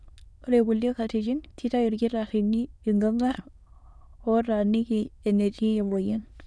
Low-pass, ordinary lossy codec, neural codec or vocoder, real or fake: none; none; autoencoder, 22.05 kHz, a latent of 192 numbers a frame, VITS, trained on many speakers; fake